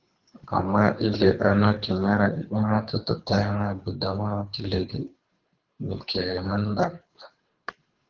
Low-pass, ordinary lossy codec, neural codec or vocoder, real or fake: 7.2 kHz; Opus, 32 kbps; codec, 24 kHz, 3 kbps, HILCodec; fake